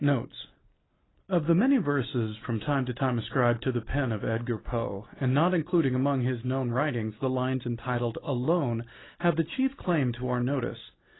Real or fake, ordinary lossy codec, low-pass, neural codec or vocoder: real; AAC, 16 kbps; 7.2 kHz; none